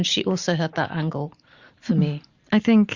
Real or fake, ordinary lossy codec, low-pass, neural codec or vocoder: real; Opus, 64 kbps; 7.2 kHz; none